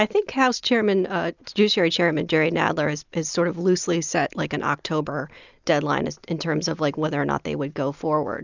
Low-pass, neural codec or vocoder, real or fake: 7.2 kHz; none; real